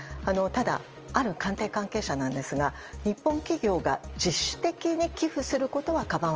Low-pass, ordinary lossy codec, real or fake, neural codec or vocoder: 7.2 kHz; Opus, 24 kbps; real; none